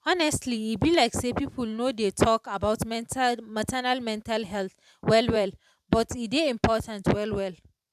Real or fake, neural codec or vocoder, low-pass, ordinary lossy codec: fake; vocoder, 44.1 kHz, 128 mel bands every 512 samples, BigVGAN v2; 14.4 kHz; none